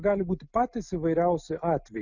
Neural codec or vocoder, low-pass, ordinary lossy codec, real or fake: none; 7.2 kHz; Opus, 64 kbps; real